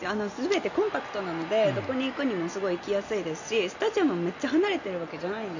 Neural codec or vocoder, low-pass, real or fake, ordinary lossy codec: none; 7.2 kHz; real; none